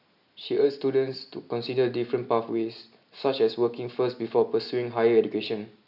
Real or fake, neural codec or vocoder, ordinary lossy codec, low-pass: real; none; none; 5.4 kHz